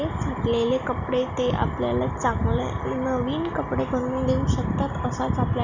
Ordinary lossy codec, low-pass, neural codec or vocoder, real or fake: Opus, 64 kbps; 7.2 kHz; none; real